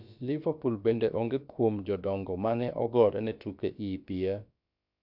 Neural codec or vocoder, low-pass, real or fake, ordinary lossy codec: codec, 16 kHz, about 1 kbps, DyCAST, with the encoder's durations; 5.4 kHz; fake; none